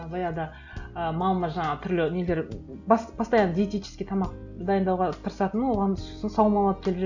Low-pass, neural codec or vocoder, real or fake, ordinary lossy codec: 7.2 kHz; none; real; none